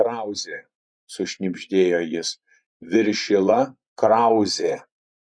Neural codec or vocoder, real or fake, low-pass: vocoder, 24 kHz, 100 mel bands, Vocos; fake; 9.9 kHz